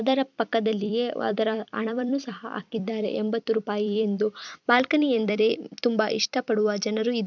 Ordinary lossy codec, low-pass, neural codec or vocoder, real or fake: none; 7.2 kHz; vocoder, 44.1 kHz, 128 mel bands every 256 samples, BigVGAN v2; fake